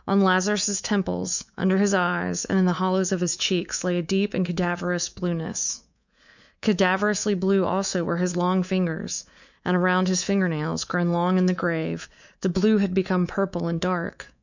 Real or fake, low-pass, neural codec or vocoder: fake; 7.2 kHz; codec, 24 kHz, 3.1 kbps, DualCodec